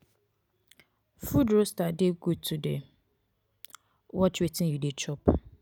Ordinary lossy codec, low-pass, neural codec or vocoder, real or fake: none; none; none; real